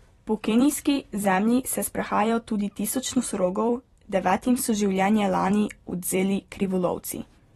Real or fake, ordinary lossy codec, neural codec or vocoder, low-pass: fake; AAC, 32 kbps; vocoder, 44.1 kHz, 128 mel bands every 256 samples, BigVGAN v2; 19.8 kHz